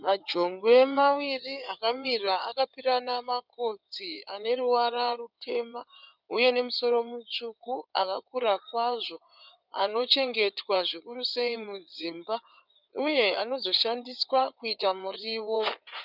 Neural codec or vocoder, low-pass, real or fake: codec, 16 kHz in and 24 kHz out, 2.2 kbps, FireRedTTS-2 codec; 5.4 kHz; fake